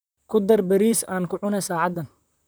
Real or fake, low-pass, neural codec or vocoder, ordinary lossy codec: fake; none; vocoder, 44.1 kHz, 128 mel bands, Pupu-Vocoder; none